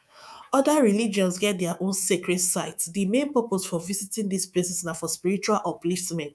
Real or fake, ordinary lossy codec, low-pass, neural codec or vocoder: fake; none; none; codec, 24 kHz, 3.1 kbps, DualCodec